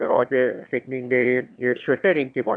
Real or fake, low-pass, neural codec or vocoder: fake; 9.9 kHz; autoencoder, 22.05 kHz, a latent of 192 numbers a frame, VITS, trained on one speaker